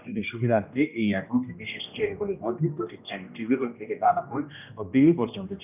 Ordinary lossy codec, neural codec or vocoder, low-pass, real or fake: none; codec, 16 kHz, 1 kbps, X-Codec, HuBERT features, trained on general audio; 3.6 kHz; fake